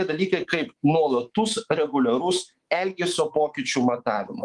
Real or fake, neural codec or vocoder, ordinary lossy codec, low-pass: fake; codec, 24 kHz, 3.1 kbps, DualCodec; Opus, 24 kbps; 10.8 kHz